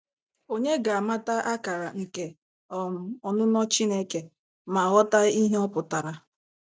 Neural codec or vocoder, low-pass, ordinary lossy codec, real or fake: none; none; none; real